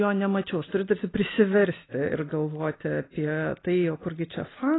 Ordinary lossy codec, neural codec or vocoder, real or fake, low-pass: AAC, 16 kbps; none; real; 7.2 kHz